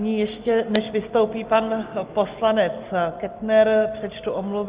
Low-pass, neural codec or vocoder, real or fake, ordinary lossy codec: 3.6 kHz; none; real; Opus, 24 kbps